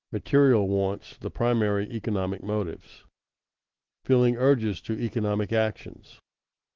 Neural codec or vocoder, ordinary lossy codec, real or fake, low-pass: none; Opus, 16 kbps; real; 7.2 kHz